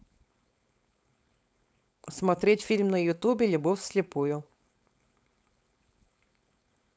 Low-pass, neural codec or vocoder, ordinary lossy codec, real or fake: none; codec, 16 kHz, 4.8 kbps, FACodec; none; fake